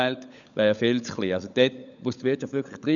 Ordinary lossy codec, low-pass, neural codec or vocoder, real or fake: none; 7.2 kHz; codec, 16 kHz, 16 kbps, FunCodec, trained on LibriTTS, 50 frames a second; fake